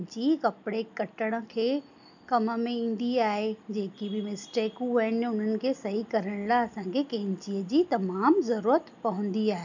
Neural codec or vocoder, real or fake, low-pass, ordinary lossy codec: none; real; 7.2 kHz; none